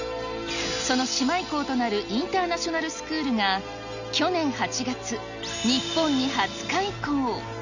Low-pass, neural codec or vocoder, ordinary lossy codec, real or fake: 7.2 kHz; none; none; real